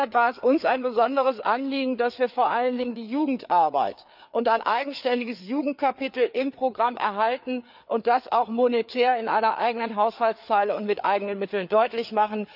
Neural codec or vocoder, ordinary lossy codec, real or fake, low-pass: codec, 16 kHz, 4 kbps, FreqCodec, larger model; none; fake; 5.4 kHz